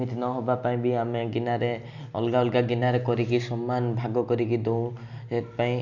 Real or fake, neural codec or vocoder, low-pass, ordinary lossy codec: real; none; 7.2 kHz; none